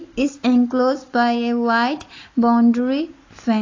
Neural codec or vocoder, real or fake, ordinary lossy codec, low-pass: none; real; AAC, 32 kbps; 7.2 kHz